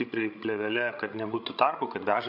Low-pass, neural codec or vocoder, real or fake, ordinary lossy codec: 5.4 kHz; codec, 16 kHz, 16 kbps, FreqCodec, larger model; fake; MP3, 48 kbps